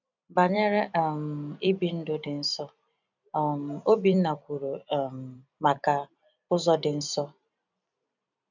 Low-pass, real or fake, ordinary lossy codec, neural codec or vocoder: 7.2 kHz; fake; none; vocoder, 44.1 kHz, 128 mel bands every 256 samples, BigVGAN v2